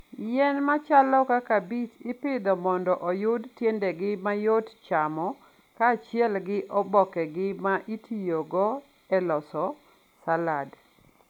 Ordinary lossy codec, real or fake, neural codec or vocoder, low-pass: none; real; none; 19.8 kHz